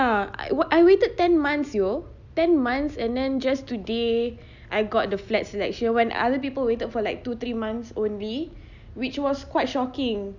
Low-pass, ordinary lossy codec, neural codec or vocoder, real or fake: 7.2 kHz; none; none; real